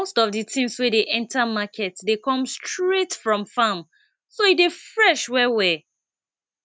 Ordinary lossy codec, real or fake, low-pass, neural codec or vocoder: none; real; none; none